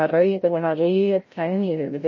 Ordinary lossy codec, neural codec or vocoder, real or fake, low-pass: MP3, 32 kbps; codec, 16 kHz, 0.5 kbps, FreqCodec, larger model; fake; 7.2 kHz